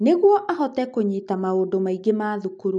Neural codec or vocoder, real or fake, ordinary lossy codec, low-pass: none; real; none; 10.8 kHz